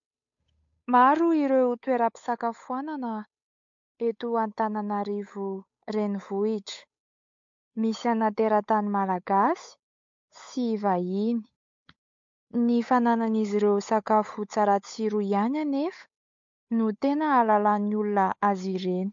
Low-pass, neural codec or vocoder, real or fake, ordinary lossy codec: 7.2 kHz; codec, 16 kHz, 8 kbps, FunCodec, trained on Chinese and English, 25 frames a second; fake; MP3, 48 kbps